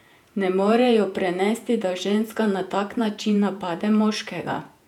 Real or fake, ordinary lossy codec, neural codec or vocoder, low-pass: fake; none; vocoder, 48 kHz, 128 mel bands, Vocos; 19.8 kHz